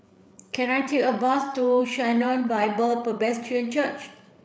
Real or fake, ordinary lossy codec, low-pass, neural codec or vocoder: fake; none; none; codec, 16 kHz, 8 kbps, FreqCodec, larger model